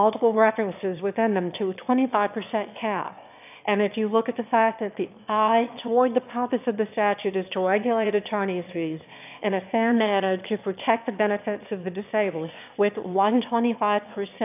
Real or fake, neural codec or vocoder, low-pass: fake; autoencoder, 22.05 kHz, a latent of 192 numbers a frame, VITS, trained on one speaker; 3.6 kHz